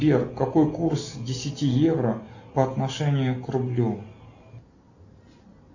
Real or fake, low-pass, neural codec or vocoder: real; 7.2 kHz; none